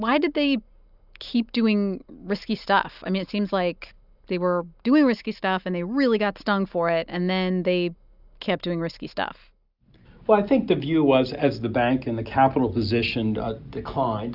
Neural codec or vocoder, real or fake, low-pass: none; real; 5.4 kHz